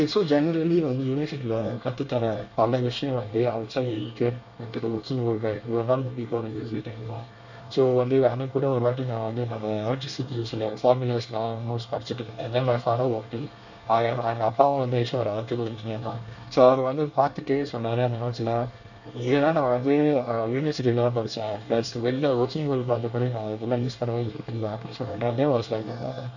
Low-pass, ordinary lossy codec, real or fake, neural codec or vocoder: 7.2 kHz; none; fake; codec, 24 kHz, 1 kbps, SNAC